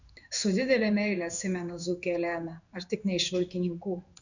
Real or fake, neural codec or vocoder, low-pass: fake; codec, 16 kHz in and 24 kHz out, 1 kbps, XY-Tokenizer; 7.2 kHz